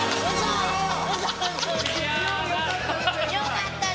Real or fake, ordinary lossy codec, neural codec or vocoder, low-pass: real; none; none; none